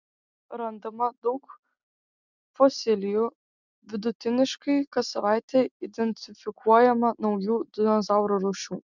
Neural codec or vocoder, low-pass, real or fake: none; 7.2 kHz; real